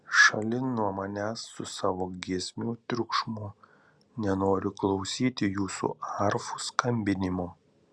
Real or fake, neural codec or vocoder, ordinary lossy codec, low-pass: real; none; Opus, 64 kbps; 9.9 kHz